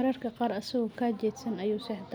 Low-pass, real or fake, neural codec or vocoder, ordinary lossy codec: none; real; none; none